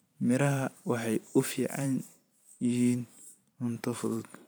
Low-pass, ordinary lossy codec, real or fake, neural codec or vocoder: none; none; fake; vocoder, 44.1 kHz, 128 mel bands every 512 samples, BigVGAN v2